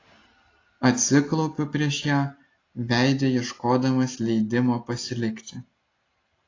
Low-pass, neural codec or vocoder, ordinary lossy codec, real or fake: 7.2 kHz; none; AAC, 32 kbps; real